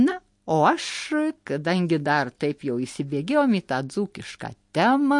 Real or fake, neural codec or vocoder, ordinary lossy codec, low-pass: real; none; MP3, 48 kbps; 10.8 kHz